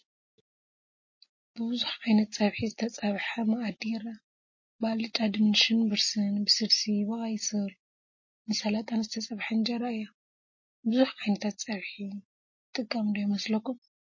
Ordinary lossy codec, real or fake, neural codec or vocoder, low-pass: MP3, 32 kbps; real; none; 7.2 kHz